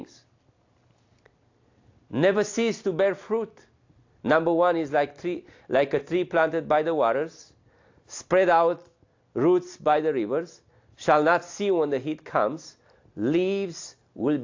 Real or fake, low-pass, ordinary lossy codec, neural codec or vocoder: real; 7.2 kHz; AAC, 48 kbps; none